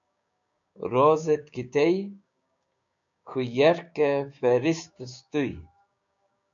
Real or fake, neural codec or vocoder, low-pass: fake; codec, 16 kHz, 6 kbps, DAC; 7.2 kHz